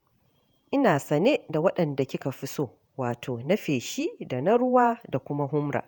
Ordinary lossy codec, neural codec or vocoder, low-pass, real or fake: none; vocoder, 48 kHz, 128 mel bands, Vocos; none; fake